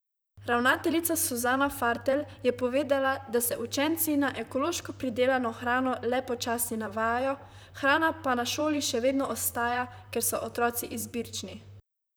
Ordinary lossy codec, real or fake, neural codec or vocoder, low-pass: none; fake; vocoder, 44.1 kHz, 128 mel bands, Pupu-Vocoder; none